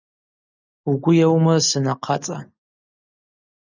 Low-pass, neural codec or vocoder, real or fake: 7.2 kHz; none; real